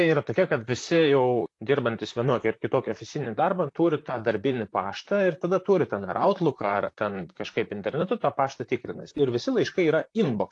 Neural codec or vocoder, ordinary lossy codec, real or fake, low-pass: vocoder, 44.1 kHz, 128 mel bands, Pupu-Vocoder; AAC, 48 kbps; fake; 10.8 kHz